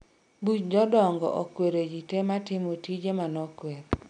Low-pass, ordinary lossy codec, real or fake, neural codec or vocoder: 9.9 kHz; none; real; none